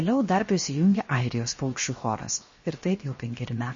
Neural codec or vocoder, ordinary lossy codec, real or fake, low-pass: codec, 16 kHz, 0.7 kbps, FocalCodec; MP3, 32 kbps; fake; 7.2 kHz